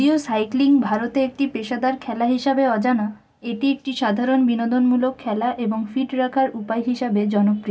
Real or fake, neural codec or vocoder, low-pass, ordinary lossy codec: real; none; none; none